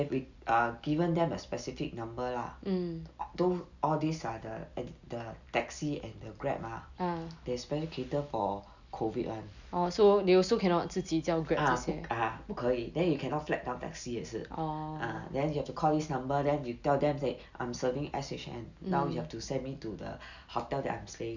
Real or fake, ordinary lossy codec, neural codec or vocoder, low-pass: real; none; none; 7.2 kHz